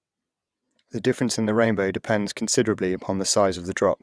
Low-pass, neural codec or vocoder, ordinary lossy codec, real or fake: none; vocoder, 22.05 kHz, 80 mel bands, WaveNeXt; none; fake